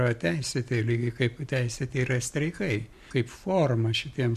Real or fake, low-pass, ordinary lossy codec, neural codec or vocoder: real; 14.4 kHz; MP3, 64 kbps; none